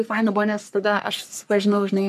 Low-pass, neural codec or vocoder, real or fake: 14.4 kHz; codec, 44.1 kHz, 3.4 kbps, Pupu-Codec; fake